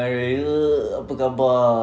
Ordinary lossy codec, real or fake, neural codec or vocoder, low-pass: none; real; none; none